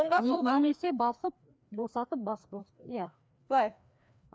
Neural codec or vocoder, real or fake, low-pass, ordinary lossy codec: codec, 16 kHz, 2 kbps, FreqCodec, larger model; fake; none; none